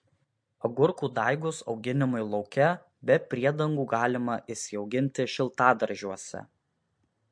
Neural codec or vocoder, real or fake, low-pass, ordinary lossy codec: none; real; 9.9 kHz; MP3, 48 kbps